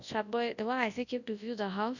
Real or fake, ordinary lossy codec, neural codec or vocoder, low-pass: fake; none; codec, 24 kHz, 0.9 kbps, WavTokenizer, large speech release; 7.2 kHz